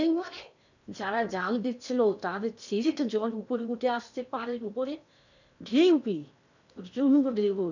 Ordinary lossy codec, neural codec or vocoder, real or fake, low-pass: none; codec, 16 kHz in and 24 kHz out, 0.8 kbps, FocalCodec, streaming, 65536 codes; fake; 7.2 kHz